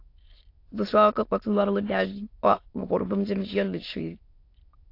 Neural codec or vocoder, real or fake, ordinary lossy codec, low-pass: autoencoder, 22.05 kHz, a latent of 192 numbers a frame, VITS, trained on many speakers; fake; AAC, 32 kbps; 5.4 kHz